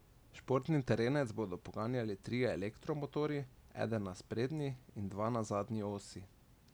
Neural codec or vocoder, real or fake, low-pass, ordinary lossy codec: none; real; none; none